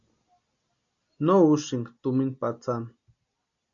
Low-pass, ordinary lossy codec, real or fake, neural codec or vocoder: 7.2 kHz; MP3, 96 kbps; real; none